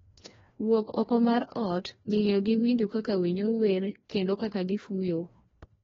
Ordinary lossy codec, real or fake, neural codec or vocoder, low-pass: AAC, 24 kbps; fake; codec, 16 kHz, 1 kbps, FreqCodec, larger model; 7.2 kHz